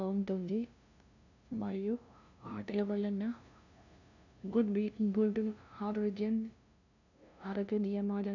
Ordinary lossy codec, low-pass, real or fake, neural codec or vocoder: none; 7.2 kHz; fake; codec, 16 kHz, 0.5 kbps, FunCodec, trained on LibriTTS, 25 frames a second